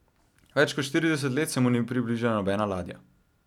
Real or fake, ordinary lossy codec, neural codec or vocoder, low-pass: real; none; none; 19.8 kHz